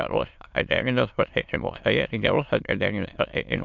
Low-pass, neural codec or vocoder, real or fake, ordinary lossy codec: 7.2 kHz; autoencoder, 22.05 kHz, a latent of 192 numbers a frame, VITS, trained on many speakers; fake; MP3, 64 kbps